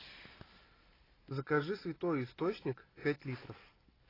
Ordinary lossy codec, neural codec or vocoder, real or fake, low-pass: AAC, 24 kbps; none; real; 5.4 kHz